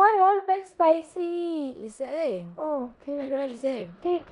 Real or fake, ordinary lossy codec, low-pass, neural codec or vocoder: fake; none; 10.8 kHz; codec, 16 kHz in and 24 kHz out, 0.9 kbps, LongCat-Audio-Codec, four codebook decoder